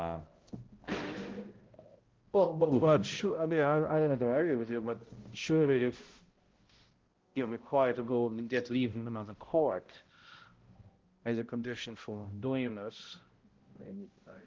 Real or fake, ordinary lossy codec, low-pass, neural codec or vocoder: fake; Opus, 16 kbps; 7.2 kHz; codec, 16 kHz, 0.5 kbps, X-Codec, HuBERT features, trained on balanced general audio